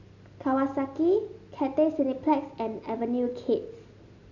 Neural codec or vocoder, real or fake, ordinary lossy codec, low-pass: none; real; none; 7.2 kHz